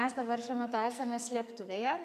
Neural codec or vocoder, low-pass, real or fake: codec, 44.1 kHz, 2.6 kbps, SNAC; 14.4 kHz; fake